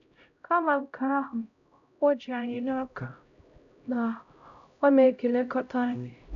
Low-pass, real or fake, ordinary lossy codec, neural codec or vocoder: 7.2 kHz; fake; none; codec, 16 kHz, 0.5 kbps, X-Codec, HuBERT features, trained on LibriSpeech